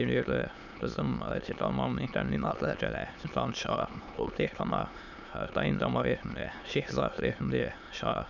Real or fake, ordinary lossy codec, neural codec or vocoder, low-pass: fake; none; autoencoder, 22.05 kHz, a latent of 192 numbers a frame, VITS, trained on many speakers; 7.2 kHz